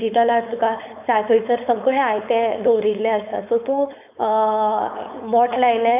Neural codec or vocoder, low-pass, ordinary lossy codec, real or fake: codec, 16 kHz, 4.8 kbps, FACodec; 3.6 kHz; none; fake